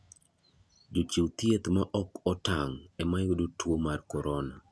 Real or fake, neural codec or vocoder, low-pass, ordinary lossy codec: real; none; none; none